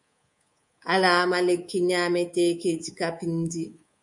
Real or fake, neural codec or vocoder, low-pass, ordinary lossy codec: fake; codec, 24 kHz, 3.1 kbps, DualCodec; 10.8 kHz; MP3, 48 kbps